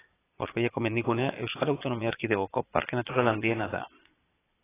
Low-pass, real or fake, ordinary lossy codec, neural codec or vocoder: 3.6 kHz; fake; AAC, 16 kbps; vocoder, 22.05 kHz, 80 mel bands, Vocos